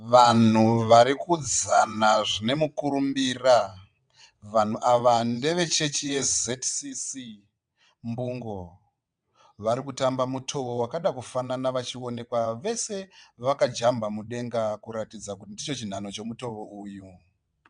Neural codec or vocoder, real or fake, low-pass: vocoder, 22.05 kHz, 80 mel bands, WaveNeXt; fake; 9.9 kHz